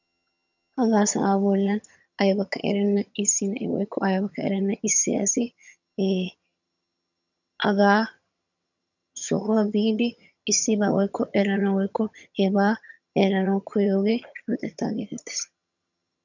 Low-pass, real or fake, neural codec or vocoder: 7.2 kHz; fake; vocoder, 22.05 kHz, 80 mel bands, HiFi-GAN